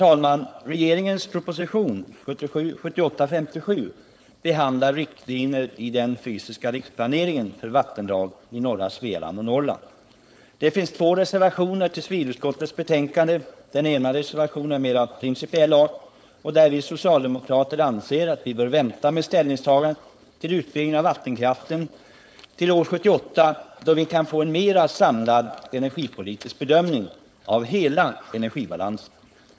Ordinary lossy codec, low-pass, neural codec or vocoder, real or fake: none; none; codec, 16 kHz, 4.8 kbps, FACodec; fake